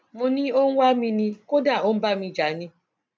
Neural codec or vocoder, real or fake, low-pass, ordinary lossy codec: none; real; none; none